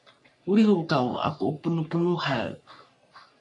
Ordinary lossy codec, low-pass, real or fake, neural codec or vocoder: MP3, 64 kbps; 10.8 kHz; fake; codec, 44.1 kHz, 3.4 kbps, Pupu-Codec